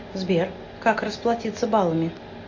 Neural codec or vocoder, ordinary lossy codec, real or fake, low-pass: none; AAC, 48 kbps; real; 7.2 kHz